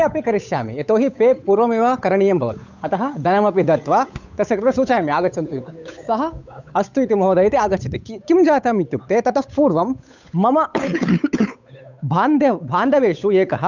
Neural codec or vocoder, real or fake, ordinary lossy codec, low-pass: codec, 16 kHz, 8 kbps, FunCodec, trained on Chinese and English, 25 frames a second; fake; none; 7.2 kHz